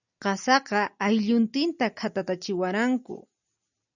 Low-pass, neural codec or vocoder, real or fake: 7.2 kHz; none; real